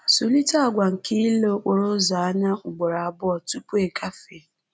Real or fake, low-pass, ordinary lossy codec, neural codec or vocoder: real; none; none; none